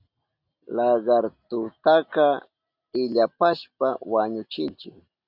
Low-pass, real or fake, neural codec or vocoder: 5.4 kHz; real; none